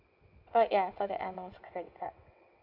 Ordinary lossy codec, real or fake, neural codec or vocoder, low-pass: none; fake; vocoder, 22.05 kHz, 80 mel bands, WaveNeXt; 5.4 kHz